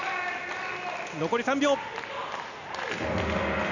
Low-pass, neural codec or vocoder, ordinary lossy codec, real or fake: 7.2 kHz; none; none; real